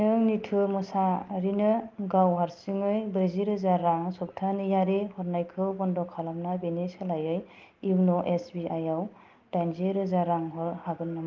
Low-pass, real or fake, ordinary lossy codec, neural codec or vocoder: 7.2 kHz; real; Opus, 32 kbps; none